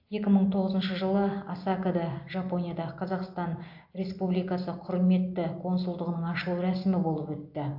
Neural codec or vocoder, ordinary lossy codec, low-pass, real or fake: none; AAC, 48 kbps; 5.4 kHz; real